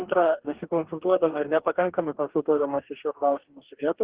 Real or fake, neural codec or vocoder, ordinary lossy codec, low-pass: fake; codec, 44.1 kHz, 2.6 kbps, DAC; Opus, 16 kbps; 3.6 kHz